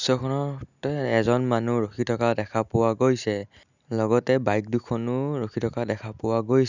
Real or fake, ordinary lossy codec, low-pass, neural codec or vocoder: real; none; 7.2 kHz; none